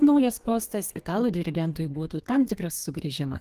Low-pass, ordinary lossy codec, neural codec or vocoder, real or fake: 14.4 kHz; Opus, 24 kbps; codec, 32 kHz, 1.9 kbps, SNAC; fake